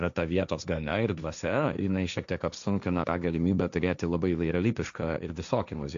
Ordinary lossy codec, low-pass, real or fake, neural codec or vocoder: AAC, 96 kbps; 7.2 kHz; fake; codec, 16 kHz, 1.1 kbps, Voila-Tokenizer